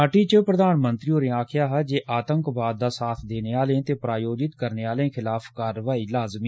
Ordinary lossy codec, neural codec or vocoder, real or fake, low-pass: none; none; real; none